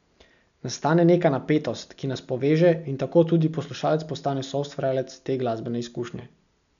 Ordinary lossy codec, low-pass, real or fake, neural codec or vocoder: none; 7.2 kHz; real; none